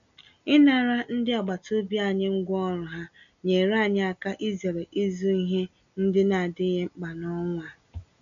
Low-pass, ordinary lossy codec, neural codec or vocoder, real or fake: 7.2 kHz; none; none; real